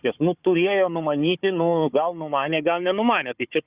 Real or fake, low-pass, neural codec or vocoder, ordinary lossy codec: fake; 3.6 kHz; codec, 16 kHz, 4 kbps, FunCodec, trained on Chinese and English, 50 frames a second; Opus, 64 kbps